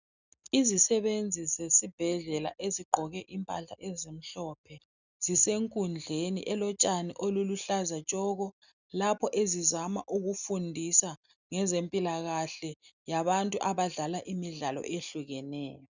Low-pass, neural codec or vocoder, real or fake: 7.2 kHz; none; real